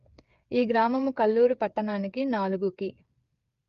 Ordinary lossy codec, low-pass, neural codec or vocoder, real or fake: Opus, 32 kbps; 7.2 kHz; codec, 16 kHz, 8 kbps, FreqCodec, smaller model; fake